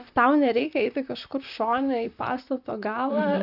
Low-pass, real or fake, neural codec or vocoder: 5.4 kHz; fake; vocoder, 44.1 kHz, 128 mel bands, Pupu-Vocoder